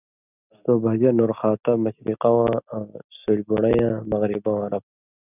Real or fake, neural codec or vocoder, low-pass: real; none; 3.6 kHz